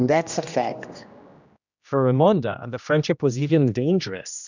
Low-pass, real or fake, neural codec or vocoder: 7.2 kHz; fake; codec, 16 kHz, 1 kbps, X-Codec, HuBERT features, trained on general audio